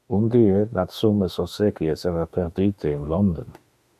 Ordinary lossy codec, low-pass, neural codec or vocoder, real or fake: AAC, 96 kbps; 14.4 kHz; autoencoder, 48 kHz, 32 numbers a frame, DAC-VAE, trained on Japanese speech; fake